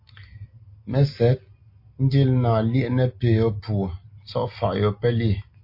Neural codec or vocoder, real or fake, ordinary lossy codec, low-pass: none; real; MP3, 32 kbps; 5.4 kHz